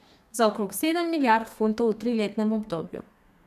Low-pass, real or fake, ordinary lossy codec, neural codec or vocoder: 14.4 kHz; fake; none; codec, 32 kHz, 1.9 kbps, SNAC